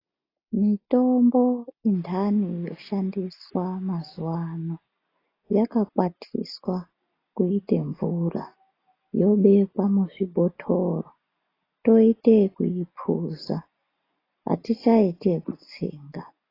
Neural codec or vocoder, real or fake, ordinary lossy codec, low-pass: none; real; AAC, 24 kbps; 5.4 kHz